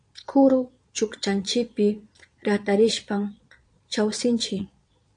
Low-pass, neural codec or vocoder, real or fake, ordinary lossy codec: 9.9 kHz; vocoder, 22.05 kHz, 80 mel bands, Vocos; fake; AAC, 64 kbps